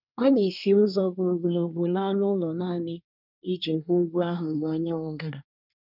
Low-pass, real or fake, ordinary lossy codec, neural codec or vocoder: 5.4 kHz; fake; none; codec, 24 kHz, 1 kbps, SNAC